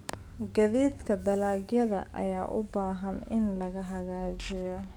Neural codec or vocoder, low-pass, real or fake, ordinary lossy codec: codec, 44.1 kHz, 7.8 kbps, DAC; 19.8 kHz; fake; none